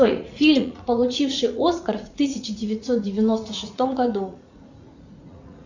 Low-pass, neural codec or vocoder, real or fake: 7.2 kHz; vocoder, 44.1 kHz, 80 mel bands, Vocos; fake